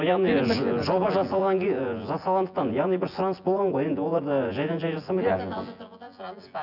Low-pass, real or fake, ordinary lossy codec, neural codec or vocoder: 5.4 kHz; fake; none; vocoder, 24 kHz, 100 mel bands, Vocos